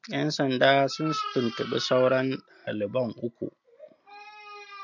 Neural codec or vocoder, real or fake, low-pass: none; real; 7.2 kHz